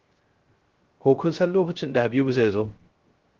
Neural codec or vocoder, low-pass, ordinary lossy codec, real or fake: codec, 16 kHz, 0.3 kbps, FocalCodec; 7.2 kHz; Opus, 16 kbps; fake